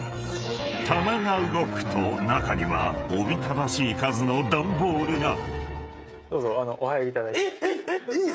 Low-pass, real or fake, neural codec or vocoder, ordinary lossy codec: none; fake; codec, 16 kHz, 16 kbps, FreqCodec, smaller model; none